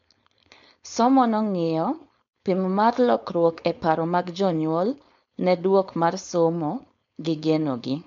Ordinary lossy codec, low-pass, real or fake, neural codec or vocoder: MP3, 48 kbps; 7.2 kHz; fake; codec, 16 kHz, 4.8 kbps, FACodec